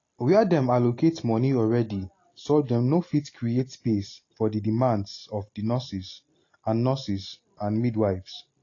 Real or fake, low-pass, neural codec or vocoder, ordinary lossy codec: real; 7.2 kHz; none; AAC, 32 kbps